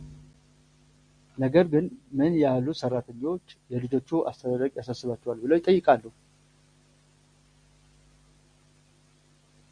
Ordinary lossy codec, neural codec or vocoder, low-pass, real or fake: Opus, 64 kbps; none; 9.9 kHz; real